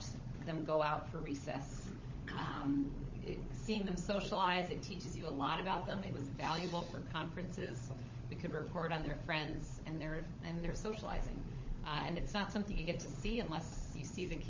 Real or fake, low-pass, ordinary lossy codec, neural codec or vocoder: fake; 7.2 kHz; MP3, 32 kbps; codec, 16 kHz, 16 kbps, FunCodec, trained on LibriTTS, 50 frames a second